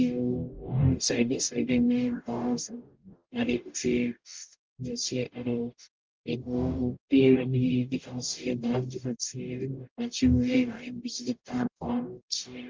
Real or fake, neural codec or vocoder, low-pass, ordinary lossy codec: fake; codec, 44.1 kHz, 0.9 kbps, DAC; 7.2 kHz; Opus, 24 kbps